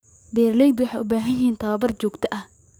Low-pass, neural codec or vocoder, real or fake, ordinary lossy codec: none; codec, 44.1 kHz, 7.8 kbps, DAC; fake; none